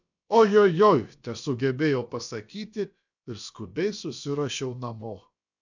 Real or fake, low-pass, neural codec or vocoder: fake; 7.2 kHz; codec, 16 kHz, about 1 kbps, DyCAST, with the encoder's durations